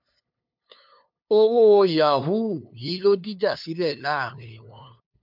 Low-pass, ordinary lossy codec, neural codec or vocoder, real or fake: 5.4 kHz; MP3, 48 kbps; codec, 16 kHz, 2 kbps, FunCodec, trained on LibriTTS, 25 frames a second; fake